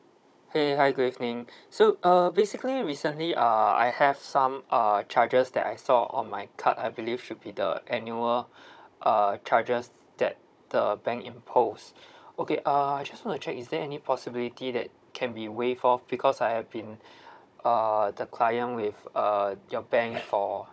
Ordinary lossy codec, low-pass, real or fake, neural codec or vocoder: none; none; fake; codec, 16 kHz, 16 kbps, FunCodec, trained on Chinese and English, 50 frames a second